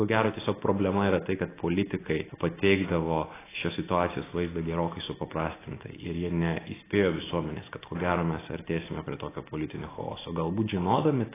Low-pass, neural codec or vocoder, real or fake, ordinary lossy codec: 3.6 kHz; none; real; AAC, 16 kbps